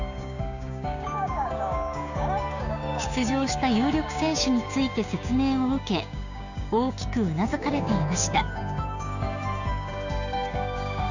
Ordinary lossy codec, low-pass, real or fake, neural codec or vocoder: none; 7.2 kHz; fake; codec, 16 kHz, 6 kbps, DAC